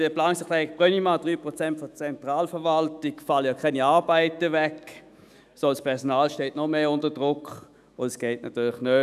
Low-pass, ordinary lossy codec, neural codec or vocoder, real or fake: 14.4 kHz; none; autoencoder, 48 kHz, 128 numbers a frame, DAC-VAE, trained on Japanese speech; fake